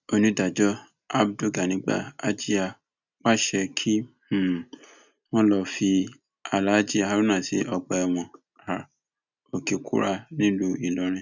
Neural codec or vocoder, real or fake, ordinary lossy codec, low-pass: none; real; none; 7.2 kHz